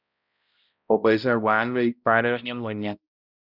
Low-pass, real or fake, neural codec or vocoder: 5.4 kHz; fake; codec, 16 kHz, 0.5 kbps, X-Codec, HuBERT features, trained on balanced general audio